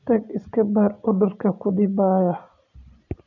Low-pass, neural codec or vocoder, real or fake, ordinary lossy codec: 7.2 kHz; none; real; none